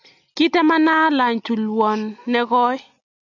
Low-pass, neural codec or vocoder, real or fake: 7.2 kHz; none; real